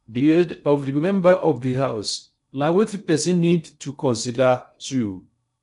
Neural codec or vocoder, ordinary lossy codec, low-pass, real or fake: codec, 16 kHz in and 24 kHz out, 0.6 kbps, FocalCodec, streaming, 2048 codes; none; 10.8 kHz; fake